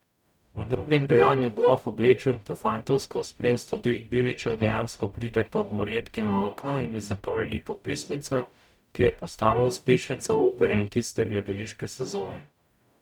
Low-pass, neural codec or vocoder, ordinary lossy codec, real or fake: 19.8 kHz; codec, 44.1 kHz, 0.9 kbps, DAC; none; fake